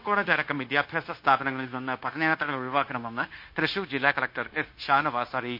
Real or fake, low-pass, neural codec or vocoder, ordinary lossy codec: fake; 5.4 kHz; codec, 16 kHz, 0.9 kbps, LongCat-Audio-Codec; MP3, 32 kbps